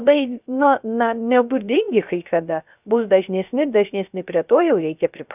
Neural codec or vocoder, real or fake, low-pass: codec, 16 kHz, 0.3 kbps, FocalCodec; fake; 3.6 kHz